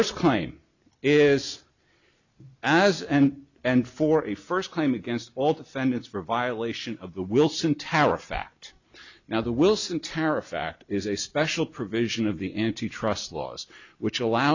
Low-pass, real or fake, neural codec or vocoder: 7.2 kHz; real; none